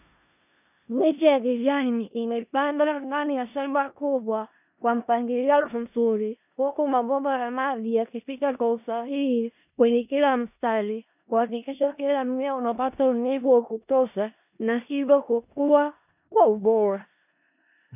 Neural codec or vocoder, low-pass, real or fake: codec, 16 kHz in and 24 kHz out, 0.4 kbps, LongCat-Audio-Codec, four codebook decoder; 3.6 kHz; fake